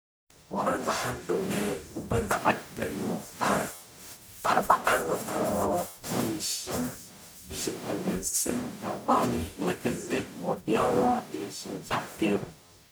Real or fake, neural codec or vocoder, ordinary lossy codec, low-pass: fake; codec, 44.1 kHz, 0.9 kbps, DAC; none; none